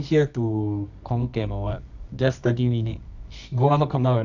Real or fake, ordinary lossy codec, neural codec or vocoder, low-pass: fake; none; codec, 24 kHz, 0.9 kbps, WavTokenizer, medium music audio release; 7.2 kHz